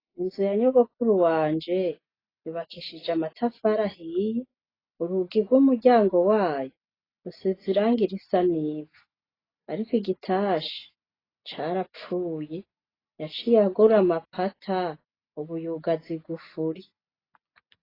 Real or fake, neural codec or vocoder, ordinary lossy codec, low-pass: real; none; AAC, 24 kbps; 5.4 kHz